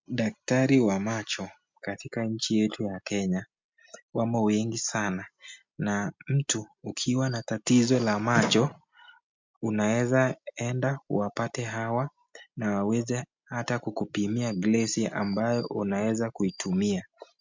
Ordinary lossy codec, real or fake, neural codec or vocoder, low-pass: MP3, 64 kbps; real; none; 7.2 kHz